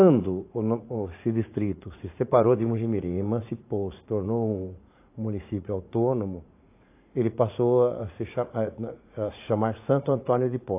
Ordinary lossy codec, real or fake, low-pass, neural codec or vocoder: AAC, 24 kbps; fake; 3.6 kHz; vocoder, 44.1 kHz, 80 mel bands, Vocos